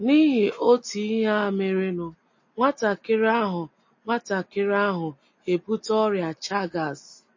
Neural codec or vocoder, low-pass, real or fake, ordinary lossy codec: none; 7.2 kHz; real; MP3, 32 kbps